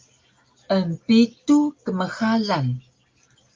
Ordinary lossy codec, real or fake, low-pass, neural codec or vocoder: Opus, 24 kbps; real; 7.2 kHz; none